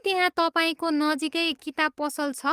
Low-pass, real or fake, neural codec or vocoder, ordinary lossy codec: 14.4 kHz; fake; codec, 44.1 kHz, 7.8 kbps, DAC; Opus, 32 kbps